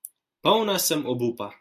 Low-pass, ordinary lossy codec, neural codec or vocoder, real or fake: 14.4 kHz; AAC, 96 kbps; none; real